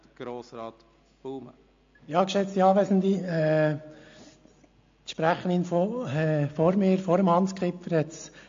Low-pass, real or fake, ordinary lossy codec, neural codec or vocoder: 7.2 kHz; real; AAC, 48 kbps; none